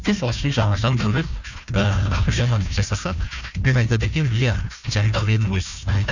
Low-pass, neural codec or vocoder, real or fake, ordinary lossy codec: 7.2 kHz; codec, 16 kHz, 1 kbps, FunCodec, trained on Chinese and English, 50 frames a second; fake; none